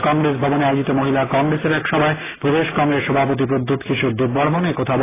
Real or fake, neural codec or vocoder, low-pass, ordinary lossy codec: real; none; 3.6 kHz; AAC, 16 kbps